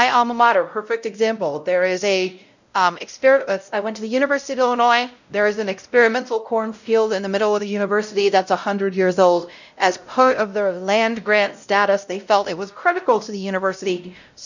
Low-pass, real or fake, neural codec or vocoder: 7.2 kHz; fake; codec, 16 kHz, 0.5 kbps, X-Codec, WavLM features, trained on Multilingual LibriSpeech